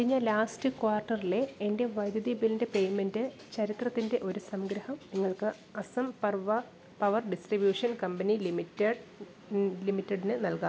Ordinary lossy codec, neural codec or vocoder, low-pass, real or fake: none; none; none; real